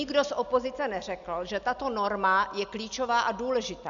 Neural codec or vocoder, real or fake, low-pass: none; real; 7.2 kHz